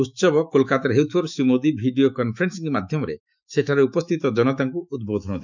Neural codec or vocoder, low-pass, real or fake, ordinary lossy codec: autoencoder, 48 kHz, 128 numbers a frame, DAC-VAE, trained on Japanese speech; 7.2 kHz; fake; none